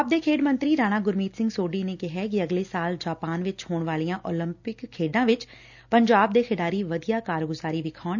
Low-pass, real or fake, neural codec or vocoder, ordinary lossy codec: 7.2 kHz; real; none; none